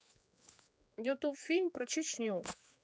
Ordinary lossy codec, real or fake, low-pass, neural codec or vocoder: none; fake; none; codec, 16 kHz, 4 kbps, X-Codec, HuBERT features, trained on general audio